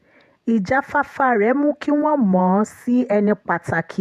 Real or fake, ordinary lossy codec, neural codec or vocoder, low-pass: fake; MP3, 96 kbps; vocoder, 48 kHz, 128 mel bands, Vocos; 14.4 kHz